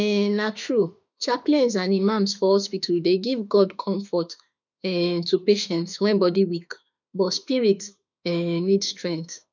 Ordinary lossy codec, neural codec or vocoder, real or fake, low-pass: none; autoencoder, 48 kHz, 32 numbers a frame, DAC-VAE, trained on Japanese speech; fake; 7.2 kHz